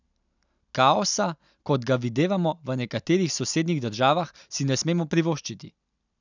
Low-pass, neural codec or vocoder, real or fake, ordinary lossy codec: 7.2 kHz; none; real; none